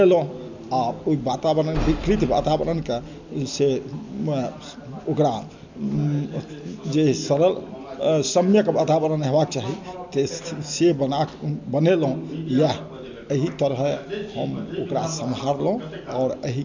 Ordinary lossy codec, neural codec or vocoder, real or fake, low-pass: MP3, 64 kbps; none; real; 7.2 kHz